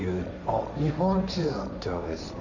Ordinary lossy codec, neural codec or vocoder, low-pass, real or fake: none; codec, 16 kHz, 1.1 kbps, Voila-Tokenizer; 7.2 kHz; fake